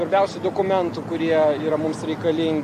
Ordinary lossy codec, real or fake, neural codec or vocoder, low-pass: MP3, 64 kbps; real; none; 14.4 kHz